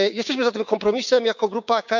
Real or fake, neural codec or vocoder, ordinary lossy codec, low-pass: fake; codec, 16 kHz, 6 kbps, DAC; none; 7.2 kHz